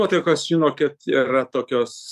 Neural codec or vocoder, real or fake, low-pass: codec, 44.1 kHz, 7.8 kbps, DAC; fake; 14.4 kHz